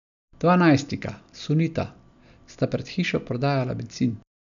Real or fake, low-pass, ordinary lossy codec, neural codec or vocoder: real; 7.2 kHz; none; none